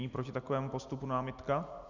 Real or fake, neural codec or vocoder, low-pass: real; none; 7.2 kHz